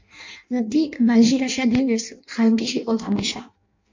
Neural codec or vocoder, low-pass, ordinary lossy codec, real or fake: codec, 16 kHz in and 24 kHz out, 0.6 kbps, FireRedTTS-2 codec; 7.2 kHz; MP3, 48 kbps; fake